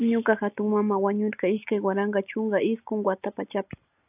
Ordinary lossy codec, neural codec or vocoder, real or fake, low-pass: AAC, 32 kbps; none; real; 3.6 kHz